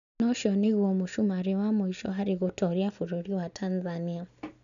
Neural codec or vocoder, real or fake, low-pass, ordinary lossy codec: none; real; 7.2 kHz; none